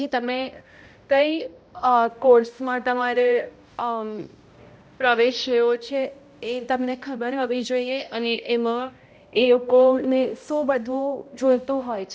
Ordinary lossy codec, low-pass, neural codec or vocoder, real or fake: none; none; codec, 16 kHz, 0.5 kbps, X-Codec, HuBERT features, trained on balanced general audio; fake